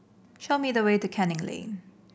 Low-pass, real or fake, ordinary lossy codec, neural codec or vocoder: none; real; none; none